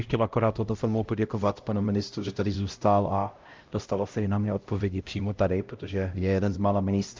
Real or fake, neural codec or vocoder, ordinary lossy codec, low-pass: fake; codec, 16 kHz, 0.5 kbps, X-Codec, HuBERT features, trained on LibriSpeech; Opus, 24 kbps; 7.2 kHz